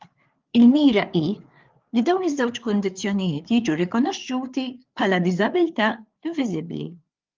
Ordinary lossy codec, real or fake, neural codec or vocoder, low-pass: Opus, 24 kbps; fake; codec, 16 kHz, 4 kbps, FreqCodec, larger model; 7.2 kHz